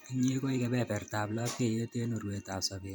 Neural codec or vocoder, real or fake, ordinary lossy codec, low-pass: vocoder, 44.1 kHz, 128 mel bands every 512 samples, BigVGAN v2; fake; none; none